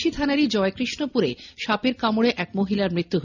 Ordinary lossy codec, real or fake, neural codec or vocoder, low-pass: none; real; none; 7.2 kHz